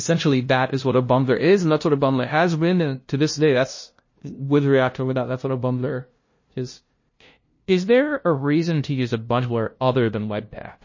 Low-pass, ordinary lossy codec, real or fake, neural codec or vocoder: 7.2 kHz; MP3, 32 kbps; fake; codec, 16 kHz, 0.5 kbps, FunCodec, trained on LibriTTS, 25 frames a second